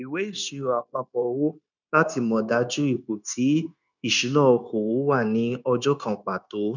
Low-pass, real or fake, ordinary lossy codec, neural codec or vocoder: 7.2 kHz; fake; none; codec, 16 kHz, 0.9 kbps, LongCat-Audio-Codec